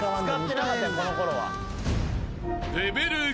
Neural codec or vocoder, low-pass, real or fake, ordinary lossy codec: none; none; real; none